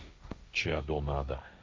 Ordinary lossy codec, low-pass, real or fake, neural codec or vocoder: none; none; fake; codec, 16 kHz, 1.1 kbps, Voila-Tokenizer